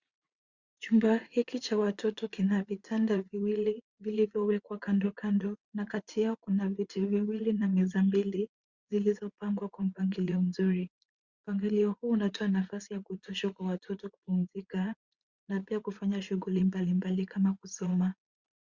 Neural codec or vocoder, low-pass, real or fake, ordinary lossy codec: vocoder, 44.1 kHz, 80 mel bands, Vocos; 7.2 kHz; fake; Opus, 64 kbps